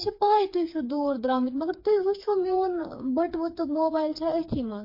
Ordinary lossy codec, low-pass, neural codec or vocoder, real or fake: MP3, 32 kbps; 5.4 kHz; codec, 16 kHz, 4 kbps, FreqCodec, smaller model; fake